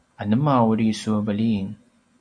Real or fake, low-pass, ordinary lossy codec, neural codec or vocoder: real; 9.9 kHz; MP3, 64 kbps; none